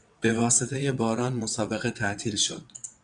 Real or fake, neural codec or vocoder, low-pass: fake; vocoder, 22.05 kHz, 80 mel bands, WaveNeXt; 9.9 kHz